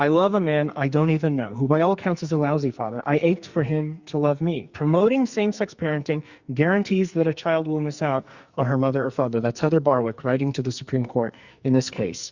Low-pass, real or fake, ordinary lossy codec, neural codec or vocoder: 7.2 kHz; fake; Opus, 64 kbps; codec, 44.1 kHz, 2.6 kbps, SNAC